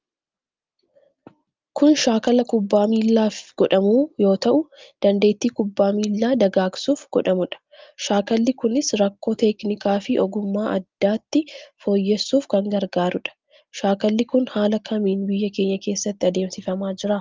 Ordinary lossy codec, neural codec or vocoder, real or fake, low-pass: Opus, 24 kbps; none; real; 7.2 kHz